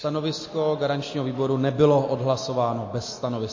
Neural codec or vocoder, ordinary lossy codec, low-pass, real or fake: none; MP3, 32 kbps; 7.2 kHz; real